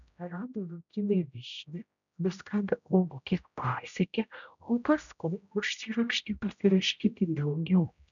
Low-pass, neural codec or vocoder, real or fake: 7.2 kHz; codec, 16 kHz, 0.5 kbps, X-Codec, HuBERT features, trained on general audio; fake